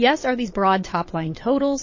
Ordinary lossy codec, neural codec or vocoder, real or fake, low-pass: MP3, 32 kbps; vocoder, 22.05 kHz, 80 mel bands, Vocos; fake; 7.2 kHz